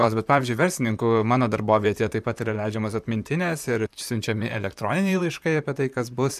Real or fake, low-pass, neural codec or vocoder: fake; 14.4 kHz; vocoder, 44.1 kHz, 128 mel bands, Pupu-Vocoder